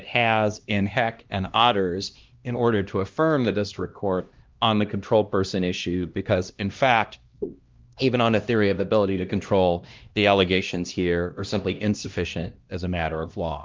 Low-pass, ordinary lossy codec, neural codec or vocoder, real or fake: 7.2 kHz; Opus, 32 kbps; codec, 16 kHz, 1 kbps, X-Codec, HuBERT features, trained on LibriSpeech; fake